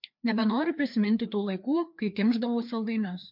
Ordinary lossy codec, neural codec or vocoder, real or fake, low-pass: MP3, 48 kbps; codec, 16 kHz, 2 kbps, FreqCodec, larger model; fake; 5.4 kHz